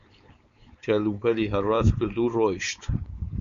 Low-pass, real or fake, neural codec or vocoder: 7.2 kHz; fake; codec, 16 kHz, 4.8 kbps, FACodec